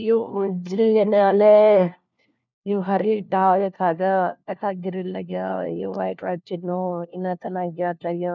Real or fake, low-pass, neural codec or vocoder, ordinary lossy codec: fake; 7.2 kHz; codec, 16 kHz, 1 kbps, FunCodec, trained on LibriTTS, 50 frames a second; none